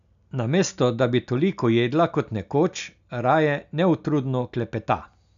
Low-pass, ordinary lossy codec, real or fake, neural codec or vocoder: 7.2 kHz; none; real; none